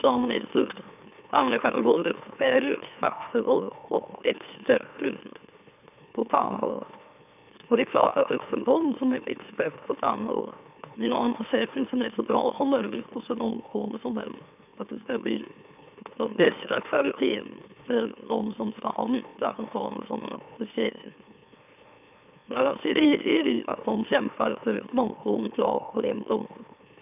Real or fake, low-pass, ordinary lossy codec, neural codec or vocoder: fake; 3.6 kHz; none; autoencoder, 44.1 kHz, a latent of 192 numbers a frame, MeloTTS